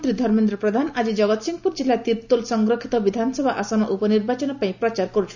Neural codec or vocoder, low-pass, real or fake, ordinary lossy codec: none; 7.2 kHz; real; none